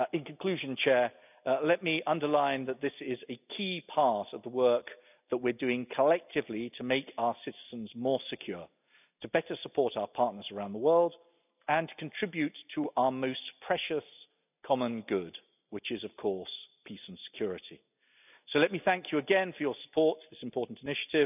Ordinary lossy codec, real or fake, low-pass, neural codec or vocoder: none; real; 3.6 kHz; none